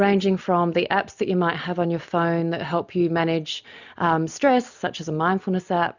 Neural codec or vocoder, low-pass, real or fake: none; 7.2 kHz; real